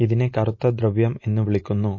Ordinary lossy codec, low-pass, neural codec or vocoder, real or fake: MP3, 32 kbps; 7.2 kHz; none; real